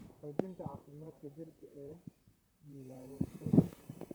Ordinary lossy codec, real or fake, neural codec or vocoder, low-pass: none; fake; vocoder, 44.1 kHz, 128 mel bands, Pupu-Vocoder; none